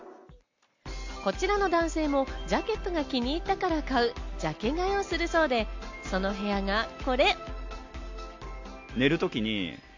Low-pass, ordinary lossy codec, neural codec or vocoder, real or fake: 7.2 kHz; MP3, 48 kbps; none; real